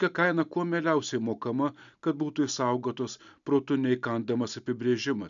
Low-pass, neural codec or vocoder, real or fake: 7.2 kHz; none; real